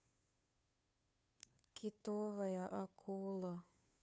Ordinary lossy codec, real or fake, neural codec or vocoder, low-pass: none; fake; codec, 16 kHz, 2 kbps, FunCodec, trained on Chinese and English, 25 frames a second; none